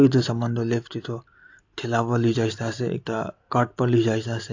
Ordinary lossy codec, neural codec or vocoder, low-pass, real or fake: AAC, 48 kbps; none; 7.2 kHz; real